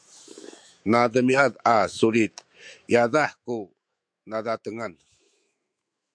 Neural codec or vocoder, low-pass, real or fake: autoencoder, 48 kHz, 128 numbers a frame, DAC-VAE, trained on Japanese speech; 9.9 kHz; fake